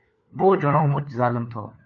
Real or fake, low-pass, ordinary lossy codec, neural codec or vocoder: fake; 7.2 kHz; MP3, 48 kbps; codec, 16 kHz, 4 kbps, FunCodec, trained on LibriTTS, 50 frames a second